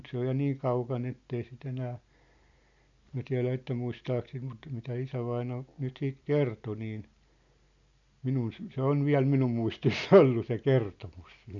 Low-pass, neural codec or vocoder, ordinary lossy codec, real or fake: 7.2 kHz; none; none; real